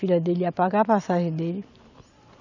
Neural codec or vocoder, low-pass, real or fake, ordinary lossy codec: none; 7.2 kHz; real; none